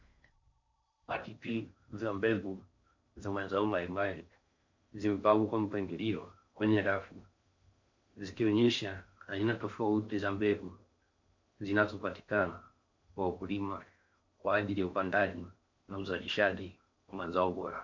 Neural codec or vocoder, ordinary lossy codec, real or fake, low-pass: codec, 16 kHz in and 24 kHz out, 0.6 kbps, FocalCodec, streaming, 4096 codes; MP3, 48 kbps; fake; 7.2 kHz